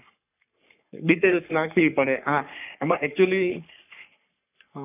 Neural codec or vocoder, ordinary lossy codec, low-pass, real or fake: codec, 44.1 kHz, 3.4 kbps, Pupu-Codec; none; 3.6 kHz; fake